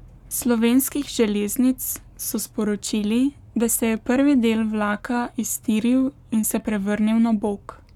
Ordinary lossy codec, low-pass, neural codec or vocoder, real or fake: none; 19.8 kHz; codec, 44.1 kHz, 7.8 kbps, Pupu-Codec; fake